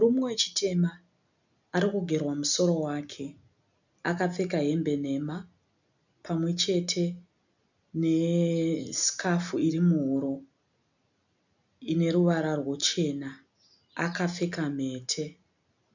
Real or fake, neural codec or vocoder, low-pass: real; none; 7.2 kHz